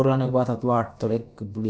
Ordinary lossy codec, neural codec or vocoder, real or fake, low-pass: none; codec, 16 kHz, about 1 kbps, DyCAST, with the encoder's durations; fake; none